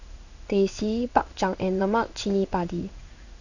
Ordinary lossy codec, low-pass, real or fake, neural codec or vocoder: none; 7.2 kHz; fake; codec, 16 kHz in and 24 kHz out, 1 kbps, XY-Tokenizer